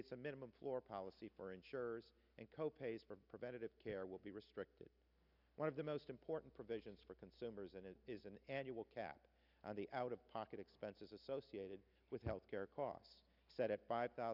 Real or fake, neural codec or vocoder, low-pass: real; none; 5.4 kHz